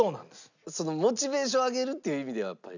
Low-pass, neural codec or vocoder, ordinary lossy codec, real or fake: 7.2 kHz; none; none; real